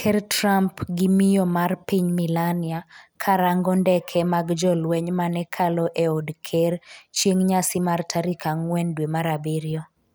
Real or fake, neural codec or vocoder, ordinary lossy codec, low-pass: real; none; none; none